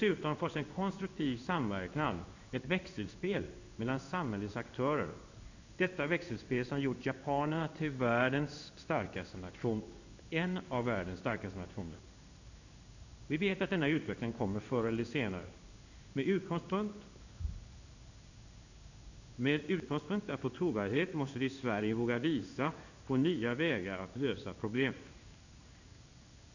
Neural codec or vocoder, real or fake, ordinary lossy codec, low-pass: codec, 16 kHz in and 24 kHz out, 1 kbps, XY-Tokenizer; fake; none; 7.2 kHz